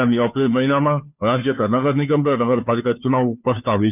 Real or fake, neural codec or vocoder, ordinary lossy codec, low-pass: fake; codec, 16 kHz, 2 kbps, FunCodec, trained on Chinese and English, 25 frames a second; none; 3.6 kHz